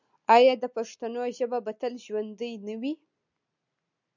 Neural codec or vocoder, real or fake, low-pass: none; real; 7.2 kHz